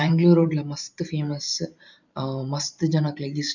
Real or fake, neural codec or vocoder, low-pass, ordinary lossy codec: fake; vocoder, 44.1 kHz, 128 mel bands every 512 samples, BigVGAN v2; 7.2 kHz; none